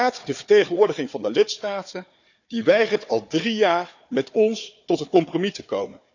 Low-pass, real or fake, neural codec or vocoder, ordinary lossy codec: 7.2 kHz; fake; codec, 16 kHz, 4 kbps, FunCodec, trained on Chinese and English, 50 frames a second; none